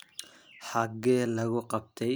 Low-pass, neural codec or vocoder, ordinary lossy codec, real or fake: none; none; none; real